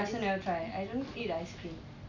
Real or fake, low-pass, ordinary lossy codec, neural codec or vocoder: real; 7.2 kHz; none; none